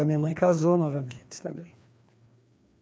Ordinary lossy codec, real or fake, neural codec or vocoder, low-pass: none; fake; codec, 16 kHz, 2 kbps, FreqCodec, larger model; none